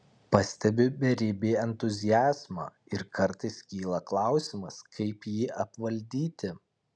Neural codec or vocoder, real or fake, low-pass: none; real; 9.9 kHz